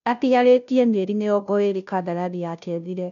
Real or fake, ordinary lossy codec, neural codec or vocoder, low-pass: fake; none; codec, 16 kHz, 0.5 kbps, FunCodec, trained on Chinese and English, 25 frames a second; 7.2 kHz